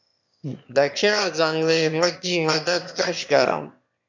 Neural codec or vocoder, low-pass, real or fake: autoencoder, 22.05 kHz, a latent of 192 numbers a frame, VITS, trained on one speaker; 7.2 kHz; fake